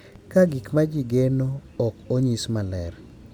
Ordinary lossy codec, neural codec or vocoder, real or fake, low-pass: none; none; real; 19.8 kHz